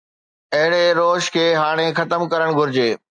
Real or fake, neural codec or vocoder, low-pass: real; none; 9.9 kHz